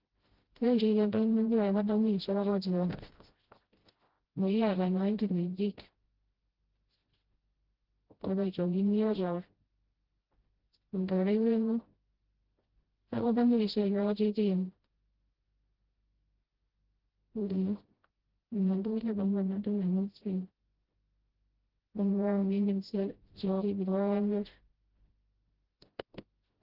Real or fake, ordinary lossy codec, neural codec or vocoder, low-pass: fake; Opus, 16 kbps; codec, 16 kHz, 0.5 kbps, FreqCodec, smaller model; 5.4 kHz